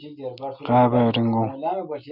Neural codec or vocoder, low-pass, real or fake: none; 5.4 kHz; real